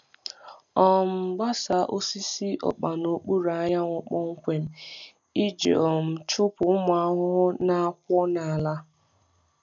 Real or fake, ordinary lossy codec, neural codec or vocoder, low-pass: real; none; none; 7.2 kHz